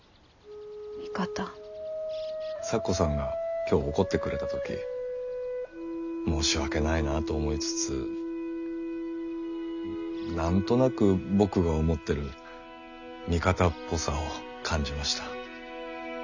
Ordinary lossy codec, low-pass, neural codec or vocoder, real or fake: none; 7.2 kHz; none; real